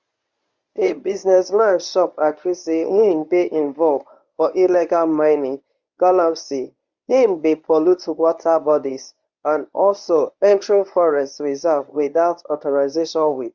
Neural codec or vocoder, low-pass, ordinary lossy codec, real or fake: codec, 24 kHz, 0.9 kbps, WavTokenizer, medium speech release version 1; 7.2 kHz; Opus, 64 kbps; fake